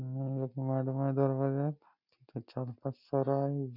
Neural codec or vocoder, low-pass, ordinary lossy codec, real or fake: none; 5.4 kHz; none; real